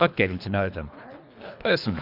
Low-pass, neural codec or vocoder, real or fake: 5.4 kHz; codec, 24 kHz, 3 kbps, HILCodec; fake